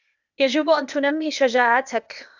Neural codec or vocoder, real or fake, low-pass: codec, 16 kHz, 0.8 kbps, ZipCodec; fake; 7.2 kHz